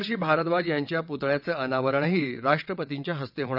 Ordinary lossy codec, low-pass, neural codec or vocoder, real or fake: none; 5.4 kHz; vocoder, 22.05 kHz, 80 mel bands, WaveNeXt; fake